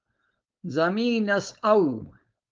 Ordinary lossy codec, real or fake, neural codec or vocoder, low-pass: Opus, 24 kbps; fake; codec, 16 kHz, 4.8 kbps, FACodec; 7.2 kHz